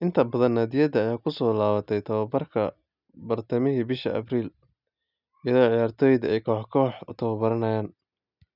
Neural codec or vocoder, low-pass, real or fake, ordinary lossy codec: none; 5.4 kHz; real; none